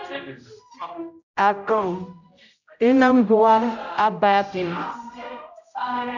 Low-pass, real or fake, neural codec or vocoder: 7.2 kHz; fake; codec, 16 kHz, 0.5 kbps, X-Codec, HuBERT features, trained on general audio